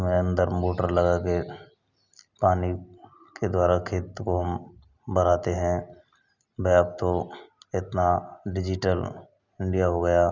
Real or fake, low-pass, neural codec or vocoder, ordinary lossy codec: real; 7.2 kHz; none; none